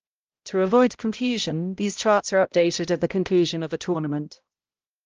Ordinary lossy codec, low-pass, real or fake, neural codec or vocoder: Opus, 24 kbps; 7.2 kHz; fake; codec, 16 kHz, 0.5 kbps, X-Codec, HuBERT features, trained on balanced general audio